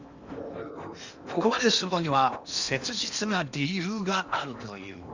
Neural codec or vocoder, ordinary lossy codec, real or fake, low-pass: codec, 16 kHz in and 24 kHz out, 0.8 kbps, FocalCodec, streaming, 65536 codes; Opus, 64 kbps; fake; 7.2 kHz